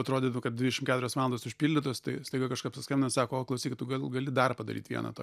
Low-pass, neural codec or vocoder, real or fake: 14.4 kHz; none; real